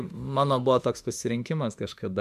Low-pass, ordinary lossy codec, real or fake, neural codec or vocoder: 14.4 kHz; MP3, 96 kbps; fake; autoencoder, 48 kHz, 32 numbers a frame, DAC-VAE, trained on Japanese speech